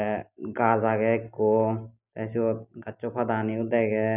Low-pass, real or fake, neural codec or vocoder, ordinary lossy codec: 3.6 kHz; real; none; none